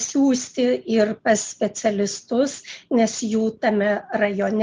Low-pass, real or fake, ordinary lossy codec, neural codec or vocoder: 7.2 kHz; real; Opus, 24 kbps; none